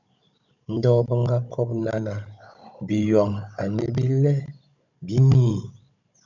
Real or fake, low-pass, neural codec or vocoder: fake; 7.2 kHz; codec, 16 kHz, 16 kbps, FunCodec, trained on Chinese and English, 50 frames a second